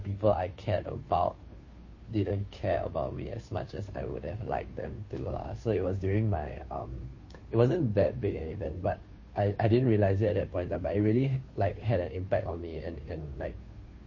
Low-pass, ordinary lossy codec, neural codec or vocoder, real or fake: 7.2 kHz; MP3, 32 kbps; codec, 16 kHz, 2 kbps, FunCodec, trained on Chinese and English, 25 frames a second; fake